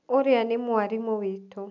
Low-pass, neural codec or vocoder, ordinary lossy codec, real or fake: 7.2 kHz; none; none; real